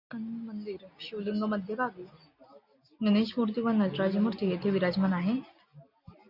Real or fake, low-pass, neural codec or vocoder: real; 5.4 kHz; none